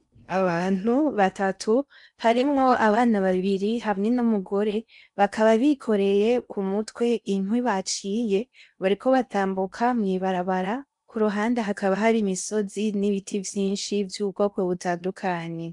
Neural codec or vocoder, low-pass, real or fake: codec, 16 kHz in and 24 kHz out, 0.8 kbps, FocalCodec, streaming, 65536 codes; 10.8 kHz; fake